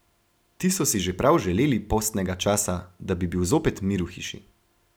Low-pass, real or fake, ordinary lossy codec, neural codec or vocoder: none; real; none; none